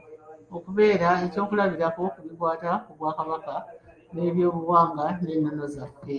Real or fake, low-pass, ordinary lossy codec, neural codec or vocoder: real; 9.9 kHz; Opus, 32 kbps; none